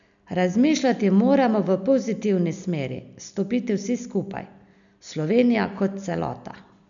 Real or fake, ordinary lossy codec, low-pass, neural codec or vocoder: real; none; 7.2 kHz; none